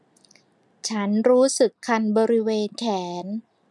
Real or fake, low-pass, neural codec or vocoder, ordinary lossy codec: real; none; none; none